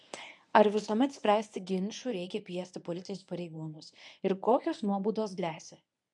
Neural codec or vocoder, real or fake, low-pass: codec, 24 kHz, 0.9 kbps, WavTokenizer, medium speech release version 1; fake; 10.8 kHz